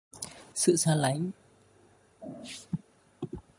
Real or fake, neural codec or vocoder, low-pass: real; none; 10.8 kHz